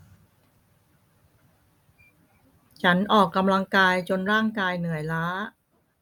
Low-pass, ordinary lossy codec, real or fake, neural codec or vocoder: 19.8 kHz; none; real; none